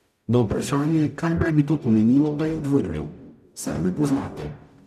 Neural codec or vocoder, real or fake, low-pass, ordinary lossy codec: codec, 44.1 kHz, 0.9 kbps, DAC; fake; 14.4 kHz; none